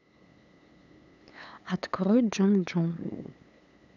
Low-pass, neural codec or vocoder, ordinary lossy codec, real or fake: 7.2 kHz; codec, 16 kHz, 8 kbps, FunCodec, trained on LibriTTS, 25 frames a second; none; fake